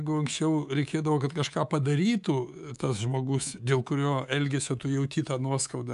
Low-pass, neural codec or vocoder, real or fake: 10.8 kHz; codec, 24 kHz, 3.1 kbps, DualCodec; fake